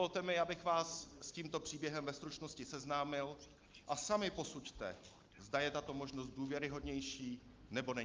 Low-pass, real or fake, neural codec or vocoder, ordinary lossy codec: 7.2 kHz; real; none; Opus, 24 kbps